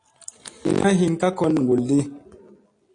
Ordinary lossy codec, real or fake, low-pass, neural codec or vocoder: AAC, 48 kbps; real; 9.9 kHz; none